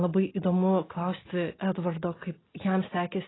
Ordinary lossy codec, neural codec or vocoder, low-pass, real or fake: AAC, 16 kbps; none; 7.2 kHz; real